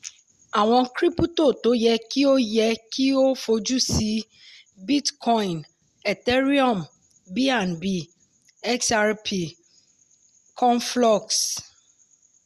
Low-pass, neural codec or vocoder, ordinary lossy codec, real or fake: 14.4 kHz; none; Opus, 64 kbps; real